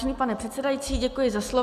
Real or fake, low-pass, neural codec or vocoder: real; 14.4 kHz; none